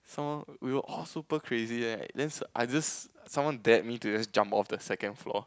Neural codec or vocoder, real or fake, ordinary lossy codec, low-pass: none; real; none; none